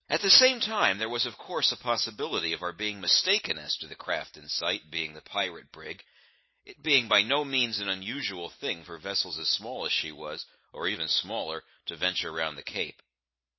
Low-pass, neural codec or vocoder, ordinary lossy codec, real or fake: 7.2 kHz; none; MP3, 24 kbps; real